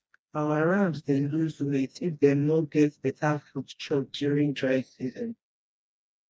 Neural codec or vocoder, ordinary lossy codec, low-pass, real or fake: codec, 16 kHz, 1 kbps, FreqCodec, smaller model; none; none; fake